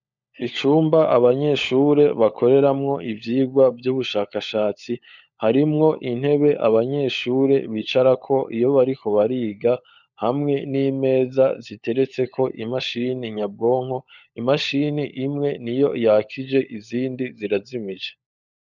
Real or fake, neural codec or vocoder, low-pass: fake; codec, 16 kHz, 16 kbps, FunCodec, trained on LibriTTS, 50 frames a second; 7.2 kHz